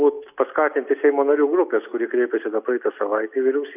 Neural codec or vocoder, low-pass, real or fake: none; 3.6 kHz; real